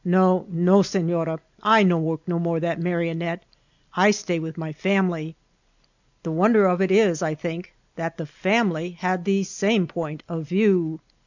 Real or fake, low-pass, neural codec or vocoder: real; 7.2 kHz; none